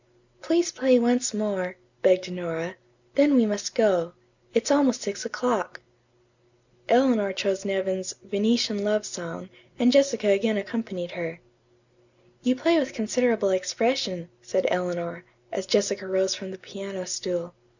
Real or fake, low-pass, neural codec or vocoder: real; 7.2 kHz; none